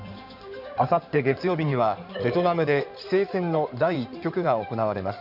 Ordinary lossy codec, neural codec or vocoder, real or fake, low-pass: none; codec, 16 kHz in and 24 kHz out, 2.2 kbps, FireRedTTS-2 codec; fake; 5.4 kHz